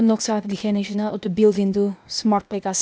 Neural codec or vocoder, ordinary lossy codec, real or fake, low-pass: codec, 16 kHz, 0.8 kbps, ZipCodec; none; fake; none